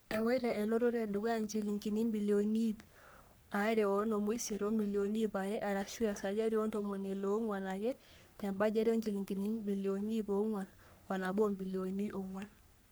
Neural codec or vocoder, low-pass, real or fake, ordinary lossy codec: codec, 44.1 kHz, 3.4 kbps, Pupu-Codec; none; fake; none